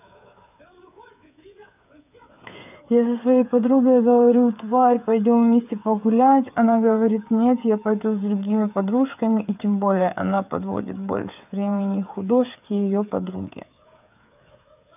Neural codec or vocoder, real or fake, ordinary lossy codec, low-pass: codec, 16 kHz, 8 kbps, FreqCodec, smaller model; fake; none; 3.6 kHz